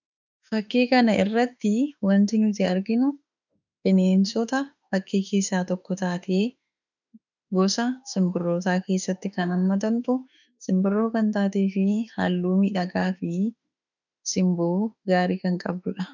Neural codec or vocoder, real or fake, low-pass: autoencoder, 48 kHz, 32 numbers a frame, DAC-VAE, trained on Japanese speech; fake; 7.2 kHz